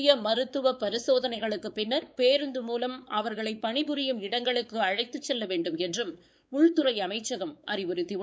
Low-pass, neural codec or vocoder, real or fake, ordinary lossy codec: none; codec, 16 kHz, 4 kbps, X-Codec, WavLM features, trained on Multilingual LibriSpeech; fake; none